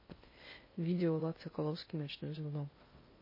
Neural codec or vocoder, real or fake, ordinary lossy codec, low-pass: codec, 16 kHz in and 24 kHz out, 0.6 kbps, FocalCodec, streaming, 2048 codes; fake; MP3, 24 kbps; 5.4 kHz